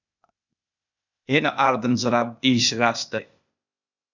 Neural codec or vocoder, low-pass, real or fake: codec, 16 kHz, 0.8 kbps, ZipCodec; 7.2 kHz; fake